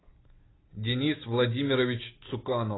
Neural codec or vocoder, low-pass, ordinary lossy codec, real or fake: none; 7.2 kHz; AAC, 16 kbps; real